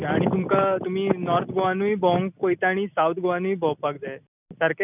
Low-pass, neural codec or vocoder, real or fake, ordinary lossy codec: 3.6 kHz; none; real; none